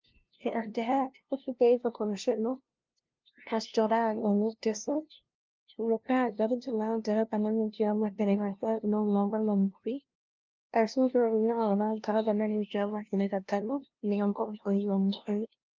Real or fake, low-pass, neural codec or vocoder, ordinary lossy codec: fake; 7.2 kHz; codec, 16 kHz, 0.5 kbps, FunCodec, trained on LibriTTS, 25 frames a second; Opus, 24 kbps